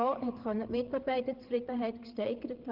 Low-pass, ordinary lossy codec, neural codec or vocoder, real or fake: 5.4 kHz; Opus, 16 kbps; codec, 16 kHz, 8 kbps, FreqCodec, larger model; fake